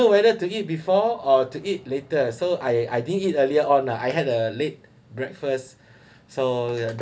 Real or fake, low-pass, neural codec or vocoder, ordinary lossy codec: real; none; none; none